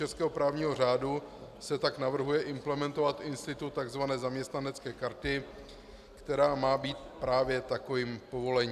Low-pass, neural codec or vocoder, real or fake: 14.4 kHz; none; real